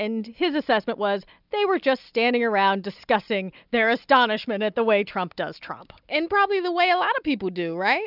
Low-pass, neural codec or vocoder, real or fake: 5.4 kHz; none; real